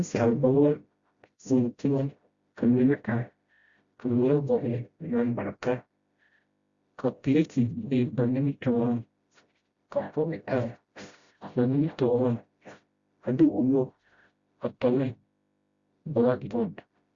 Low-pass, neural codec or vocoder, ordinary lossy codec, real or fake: 7.2 kHz; codec, 16 kHz, 0.5 kbps, FreqCodec, smaller model; Opus, 64 kbps; fake